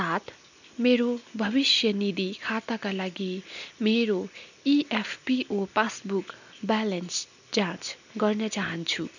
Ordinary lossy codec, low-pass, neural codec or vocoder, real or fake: none; 7.2 kHz; none; real